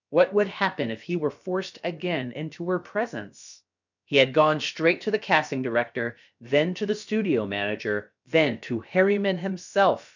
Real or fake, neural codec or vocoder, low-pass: fake; codec, 16 kHz, about 1 kbps, DyCAST, with the encoder's durations; 7.2 kHz